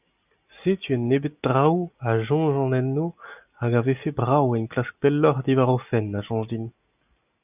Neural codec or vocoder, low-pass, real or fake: none; 3.6 kHz; real